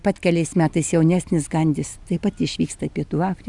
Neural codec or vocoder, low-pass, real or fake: none; 10.8 kHz; real